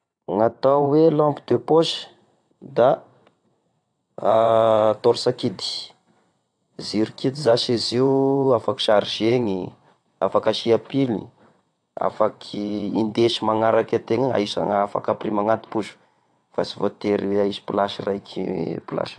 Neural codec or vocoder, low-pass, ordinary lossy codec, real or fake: vocoder, 22.05 kHz, 80 mel bands, WaveNeXt; 9.9 kHz; AAC, 64 kbps; fake